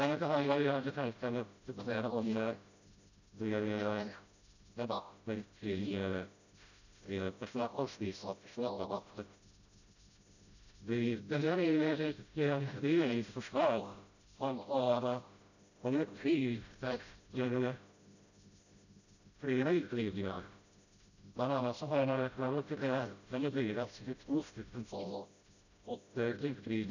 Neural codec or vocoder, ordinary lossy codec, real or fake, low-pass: codec, 16 kHz, 0.5 kbps, FreqCodec, smaller model; none; fake; 7.2 kHz